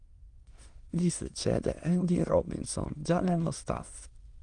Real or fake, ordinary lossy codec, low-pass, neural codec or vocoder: fake; Opus, 24 kbps; 9.9 kHz; autoencoder, 22.05 kHz, a latent of 192 numbers a frame, VITS, trained on many speakers